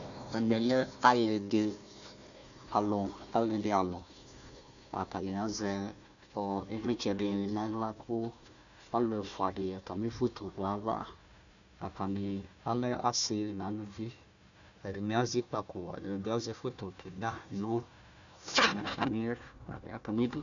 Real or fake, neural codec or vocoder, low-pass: fake; codec, 16 kHz, 1 kbps, FunCodec, trained on Chinese and English, 50 frames a second; 7.2 kHz